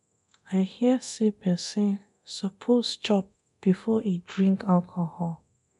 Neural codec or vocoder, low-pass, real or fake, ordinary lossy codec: codec, 24 kHz, 0.9 kbps, DualCodec; 10.8 kHz; fake; none